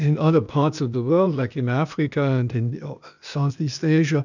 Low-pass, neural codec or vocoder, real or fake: 7.2 kHz; codec, 16 kHz, 0.8 kbps, ZipCodec; fake